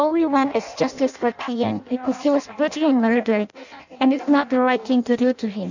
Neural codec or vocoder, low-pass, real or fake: codec, 16 kHz in and 24 kHz out, 0.6 kbps, FireRedTTS-2 codec; 7.2 kHz; fake